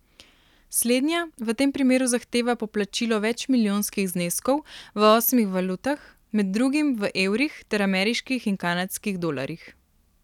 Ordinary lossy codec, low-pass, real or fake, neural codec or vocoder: none; 19.8 kHz; real; none